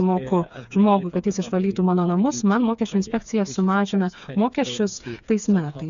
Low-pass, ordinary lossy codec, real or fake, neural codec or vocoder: 7.2 kHz; AAC, 96 kbps; fake; codec, 16 kHz, 4 kbps, FreqCodec, smaller model